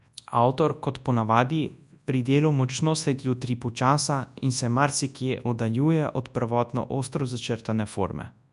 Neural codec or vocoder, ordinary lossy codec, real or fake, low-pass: codec, 24 kHz, 0.9 kbps, WavTokenizer, large speech release; none; fake; 10.8 kHz